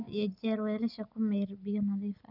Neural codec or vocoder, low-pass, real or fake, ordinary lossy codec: none; 5.4 kHz; real; none